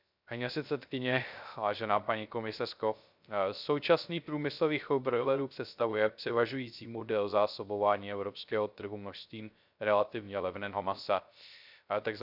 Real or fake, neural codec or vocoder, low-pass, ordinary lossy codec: fake; codec, 16 kHz, 0.3 kbps, FocalCodec; 5.4 kHz; none